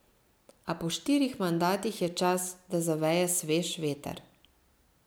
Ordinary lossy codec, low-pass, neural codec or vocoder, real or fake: none; none; none; real